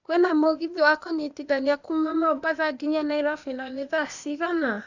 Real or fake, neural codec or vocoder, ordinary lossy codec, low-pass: fake; codec, 16 kHz, 0.8 kbps, ZipCodec; none; 7.2 kHz